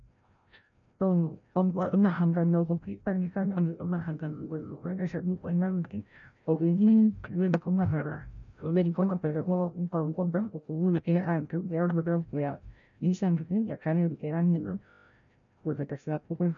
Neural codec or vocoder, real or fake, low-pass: codec, 16 kHz, 0.5 kbps, FreqCodec, larger model; fake; 7.2 kHz